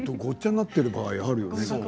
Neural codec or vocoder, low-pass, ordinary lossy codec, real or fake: none; none; none; real